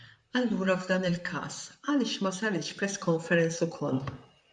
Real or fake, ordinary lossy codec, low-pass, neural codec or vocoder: fake; MP3, 96 kbps; 9.9 kHz; vocoder, 44.1 kHz, 128 mel bands, Pupu-Vocoder